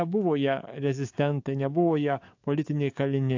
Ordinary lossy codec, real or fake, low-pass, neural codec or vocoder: AAC, 48 kbps; fake; 7.2 kHz; codec, 44.1 kHz, 7.8 kbps, DAC